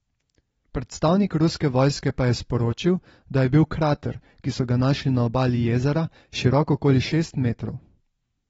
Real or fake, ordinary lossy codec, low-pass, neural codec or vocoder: real; AAC, 24 kbps; 19.8 kHz; none